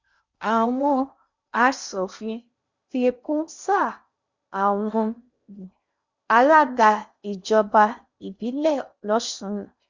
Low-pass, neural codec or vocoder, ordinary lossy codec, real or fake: 7.2 kHz; codec, 16 kHz in and 24 kHz out, 0.6 kbps, FocalCodec, streaming, 2048 codes; Opus, 64 kbps; fake